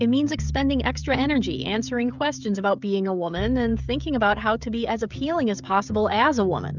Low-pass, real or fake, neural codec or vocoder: 7.2 kHz; fake; codec, 16 kHz, 16 kbps, FreqCodec, smaller model